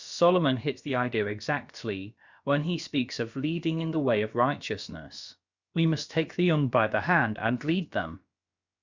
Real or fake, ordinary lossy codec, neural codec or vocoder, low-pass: fake; Opus, 64 kbps; codec, 16 kHz, about 1 kbps, DyCAST, with the encoder's durations; 7.2 kHz